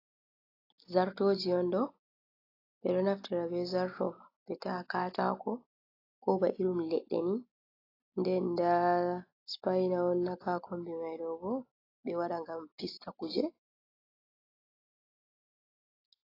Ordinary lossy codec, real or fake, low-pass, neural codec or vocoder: AAC, 24 kbps; real; 5.4 kHz; none